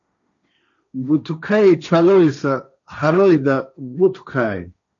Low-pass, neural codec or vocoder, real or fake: 7.2 kHz; codec, 16 kHz, 1.1 kbps, Voila-Tokenizer; fake